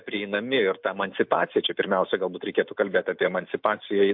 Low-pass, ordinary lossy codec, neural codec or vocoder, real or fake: 5.4 kHz; MP3, 48 kbps; none; real